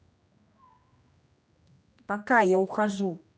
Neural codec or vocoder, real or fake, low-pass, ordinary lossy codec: codec, 16 kHz, 1 kbps, X-Codec, HuBERT features, trained on general audio; fake; none; none